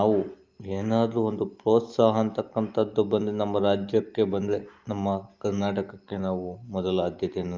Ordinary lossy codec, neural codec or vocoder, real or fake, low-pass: Opus, 24 kbps; none; real; 7.2 kHz